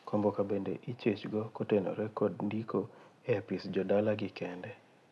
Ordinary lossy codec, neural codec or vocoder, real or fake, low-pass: none; none; real; none